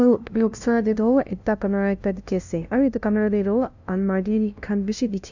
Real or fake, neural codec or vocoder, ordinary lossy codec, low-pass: fake; codec, 16 kHz, 0.5 kbps, FunCodec, trained on LibriTTS, 25 frames a second; none; 7.2 kHz